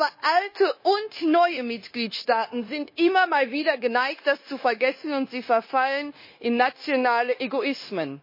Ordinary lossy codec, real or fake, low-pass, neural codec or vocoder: MP3, 24 kbps; fake; 5.4 kHz; codec, 16 kHz, 0.9 kbps, LongCat-Audio-Codec